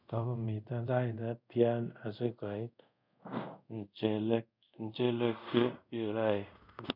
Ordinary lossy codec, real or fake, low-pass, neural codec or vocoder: none; fake; 5.4 kHz; codec, 24 kHz, 0.5 kbps, DualCodec